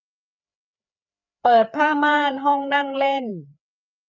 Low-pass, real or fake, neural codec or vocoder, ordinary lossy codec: 7.2 kHz; fake; codec, 16 kHz, 4 kbps, FreqCodec, larger model; none